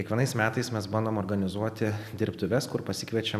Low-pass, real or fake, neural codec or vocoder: 14.4 kHz; real; none